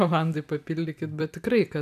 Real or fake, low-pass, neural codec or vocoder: fake; 14.4 kHz; vocoder, 44.1 kHz, 128 mel bands every 256 samples, BigVGAN v2